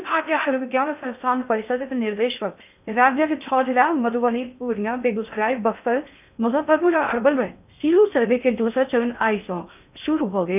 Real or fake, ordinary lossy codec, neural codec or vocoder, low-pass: fake; none; codec, 16 kHz in and 24 kHz out, 0.6 kbps, FocalCodec, streaming, 2048 codes; 3.6 kHz